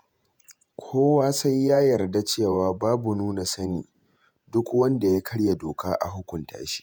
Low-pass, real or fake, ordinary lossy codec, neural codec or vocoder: none; fake; none; vocoder, 48 kHz, 128 mel bands, Vocos